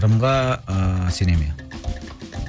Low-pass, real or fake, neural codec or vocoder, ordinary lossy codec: none; real; none; none